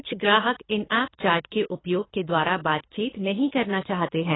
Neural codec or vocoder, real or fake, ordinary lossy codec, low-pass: codec, 24 kHz, 3 kbps, HILCodec; fake; AAC, 16 kbps; 7.2 kHz